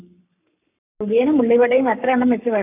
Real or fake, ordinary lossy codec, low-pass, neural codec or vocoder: fake; none; 3.6 kHz; codec, 44.1 kHz, 7.8 kbps, Pupu-Codec